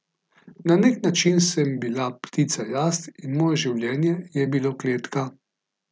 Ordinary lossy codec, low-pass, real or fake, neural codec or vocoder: none; none; real; none